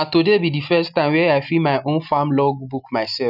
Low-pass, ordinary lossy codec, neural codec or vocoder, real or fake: 5.4 kHz; none; none; real